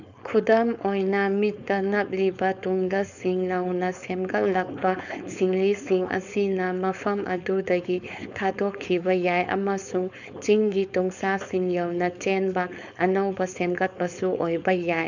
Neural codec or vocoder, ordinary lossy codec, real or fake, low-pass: codec, 16 kHz, 4.8 kbps, FACodec; none; fake; 7.2 kHz